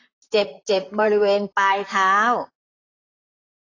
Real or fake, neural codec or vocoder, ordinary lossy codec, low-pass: fake; vocoder, 44.1 kHz, 128 mel bands, Pupu-Vocoder; AAC, 32 kbps; 7.2 kHz